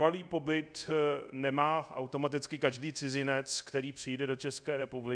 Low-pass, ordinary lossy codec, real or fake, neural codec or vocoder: 9.9 kHz; MP3, 96 kbps; fake; codec, 24 kHz, 0.5 kbps, DualCodec